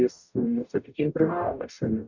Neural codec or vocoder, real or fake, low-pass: codec, 44.1 kHz, 0.9 kbps, DAC; fake; 7.2 kHz